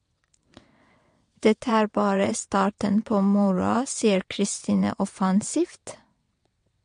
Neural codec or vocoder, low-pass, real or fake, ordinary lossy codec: vocoder, 22.05 kHz, 80 mel bands, WaveNeXt; 9.9 kHz; fake; MP3, 48 kbps